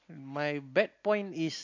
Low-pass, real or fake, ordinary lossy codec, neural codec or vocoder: 7.2 kHz; real; MP3, 48 kbps; none